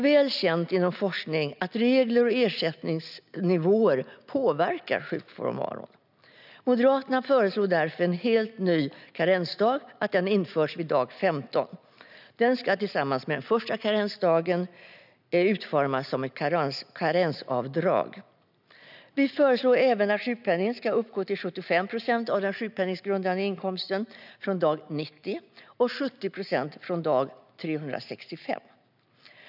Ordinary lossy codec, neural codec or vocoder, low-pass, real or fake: none; none; 5.4 kHz; real